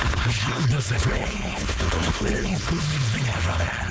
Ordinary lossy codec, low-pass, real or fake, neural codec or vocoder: none; none; fake; codec, 16 kHz, 4.8 kbps, FACodec